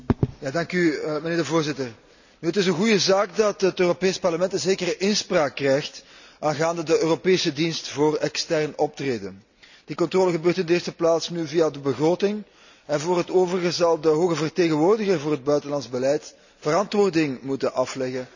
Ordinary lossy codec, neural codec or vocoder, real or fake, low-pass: none; none; real; 7.2 kHz